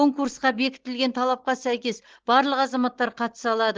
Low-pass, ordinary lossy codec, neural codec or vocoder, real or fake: 7.2 kHz; Opus, 16 kbps; none; real